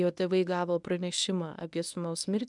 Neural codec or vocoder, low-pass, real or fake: codec, 24 kHz, 0.9 kbps, WavTokenizer, medium speech release version 1; 10.8 kHz; fake